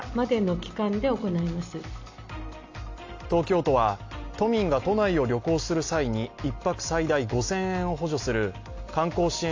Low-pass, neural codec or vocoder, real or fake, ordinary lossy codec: 7.2 kHz; none; real; AAC, 48 kbps